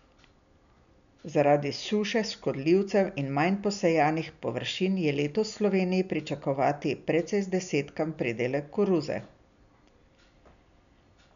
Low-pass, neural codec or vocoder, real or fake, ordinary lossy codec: 7.2 kHz; none; real; none